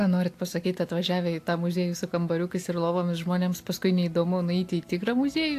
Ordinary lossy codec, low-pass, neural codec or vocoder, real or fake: AAC, 64 kbps; 14.4 kHz; none; real